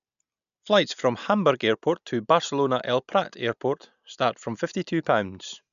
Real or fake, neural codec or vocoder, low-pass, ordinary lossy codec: real; none; 7.2 kHz; none